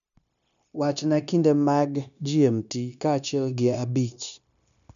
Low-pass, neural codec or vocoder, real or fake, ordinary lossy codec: 7.2 kHz; codec, 16 kHz, 0.9 kbps, LongCat-Audio-Codec; fake; none